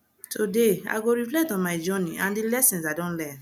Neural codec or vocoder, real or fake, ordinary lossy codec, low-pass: none; real; none; 19.8 kHz